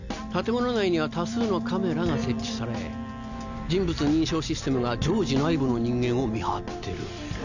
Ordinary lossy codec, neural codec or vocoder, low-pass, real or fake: none; none; 7.2 kHz; real